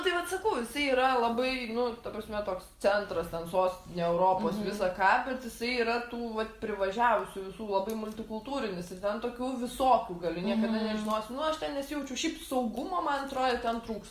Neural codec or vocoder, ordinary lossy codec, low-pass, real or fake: none; Opus, 32 kbps; 14.4 kHz; real